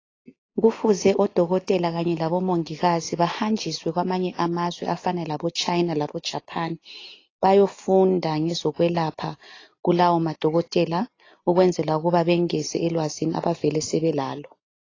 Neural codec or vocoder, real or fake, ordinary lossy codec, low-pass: none; real; AAC, 32 kbps; 7.2 kHz